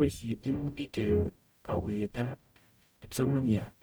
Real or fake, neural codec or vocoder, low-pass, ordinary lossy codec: fake; codec, 44.1 kHz, 0.9 kbps, DAC; none; none